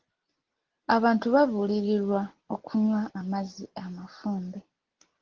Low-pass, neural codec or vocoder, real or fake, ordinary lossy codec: 7.2 kHz; none; real; Opus, 16 kbps